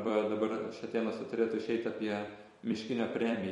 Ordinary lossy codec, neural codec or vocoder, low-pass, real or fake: MP3, 48 kbps; vocoder, 44.1 kHz, 128 mel bands every 256 samples, BigVGAN v2; 19.8 kHz; fake